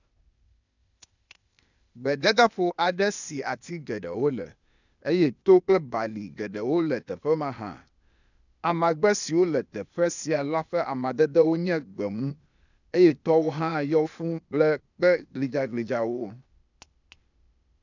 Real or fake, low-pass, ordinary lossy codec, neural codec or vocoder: fake; 7.2 kHz; none; codec, 16 kHz, 0.8 kbps, ZipCodec